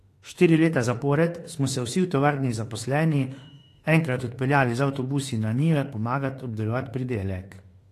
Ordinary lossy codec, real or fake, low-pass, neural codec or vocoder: AAC, 48 kbps; fake; 14.4 kHz; autoencoder, 48 kHz, 32 numbers a frame, DAC-VAE, trained on Japanese speech